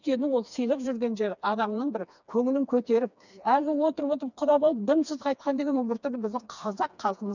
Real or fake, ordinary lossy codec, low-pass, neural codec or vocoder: fake; none; 7.2 kHz; codec, 16 kHz, 2 kbps, FreqCodec, smaller model